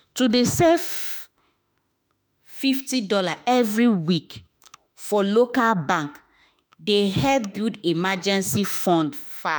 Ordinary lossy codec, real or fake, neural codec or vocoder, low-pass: none; fake; autoencoder, 48 kHz, 32 numbers a frame, DAC-VAE, trained on Japanese speech; none